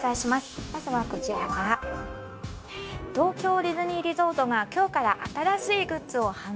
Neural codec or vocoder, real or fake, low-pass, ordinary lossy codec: codec, 16 kHz, 0.9 kbps, LongCat-Audio-Codec; fake; none; none